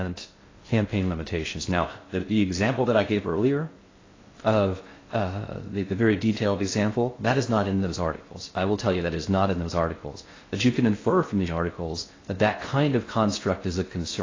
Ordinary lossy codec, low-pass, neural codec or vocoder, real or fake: AAC, 32 kbps; 7.2 kHz; codec, 16 kHz in and 24 kHz out, 0.6 kbps, FocalCodec, streaming, 2048 codes; fake